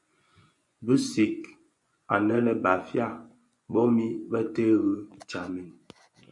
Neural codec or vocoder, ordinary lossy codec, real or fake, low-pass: vocoder, 24 kHz, 100 mel bands, Vocos; MP3, 96 kbps; fake; 10.8 kHz